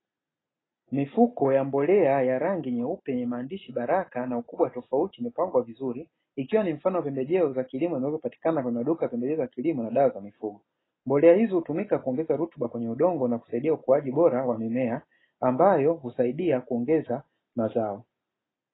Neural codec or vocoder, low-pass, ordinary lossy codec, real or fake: none; 7.2 kHz; AAC, 16 kbps; real